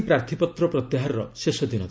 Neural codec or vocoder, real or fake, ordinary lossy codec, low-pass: none; real; none; none